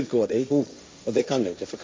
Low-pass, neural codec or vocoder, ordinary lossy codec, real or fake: none; codec, 16 kHz, 1.1 kbps, Voila-Tokenizer; none; fake